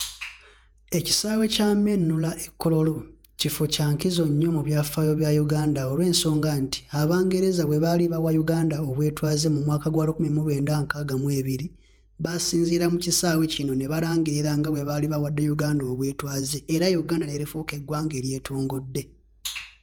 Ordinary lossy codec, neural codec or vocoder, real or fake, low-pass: none; vocoder, 48 kHz, 128 mel bands, Vocos; fake; none